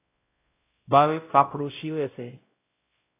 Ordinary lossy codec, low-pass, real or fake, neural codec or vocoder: AAC, 24 kbps; 3.6 kHz; fake; codec, 16 kHz, 0.5 kbps, X-Codec, WavLM features, trained on Multilingual LibriSpeech